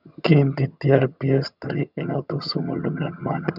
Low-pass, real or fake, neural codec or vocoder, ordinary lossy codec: 5.4 kHz; fake; vocoder, 22.05 kHz, 80 mel bands, HiFi-GAN; none